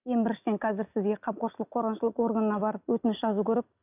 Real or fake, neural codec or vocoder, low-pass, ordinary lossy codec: real; none; 3.6 kHz; none